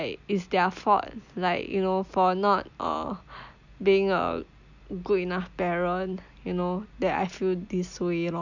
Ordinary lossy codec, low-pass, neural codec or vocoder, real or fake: none; 7.2 kHz; none; real